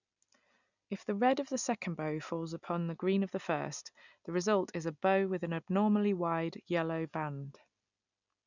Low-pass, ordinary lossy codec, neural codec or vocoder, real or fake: 7.2 kHz; none; none; real